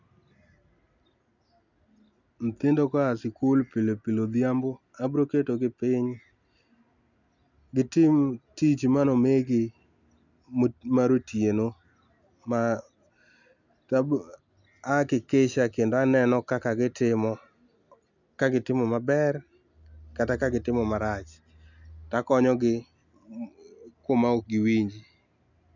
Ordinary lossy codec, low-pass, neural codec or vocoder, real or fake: none; 7.2 kHz; none; real